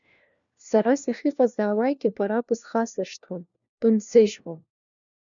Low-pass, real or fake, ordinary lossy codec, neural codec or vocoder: 7.2 kHz; fake; MP3, 96 kbps; codec, 16 kHz, 1 kbps, FunCodec, trained on LibriTTS, 50 frames a second